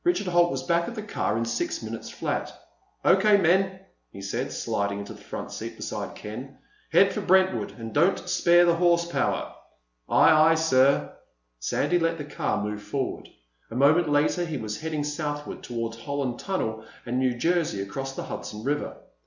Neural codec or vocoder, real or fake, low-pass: none; real; 7.2 kHz